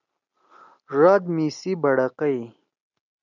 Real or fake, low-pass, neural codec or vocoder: real; 7.2 kHz; none